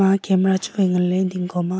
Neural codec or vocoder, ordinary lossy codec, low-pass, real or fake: none; none; none; real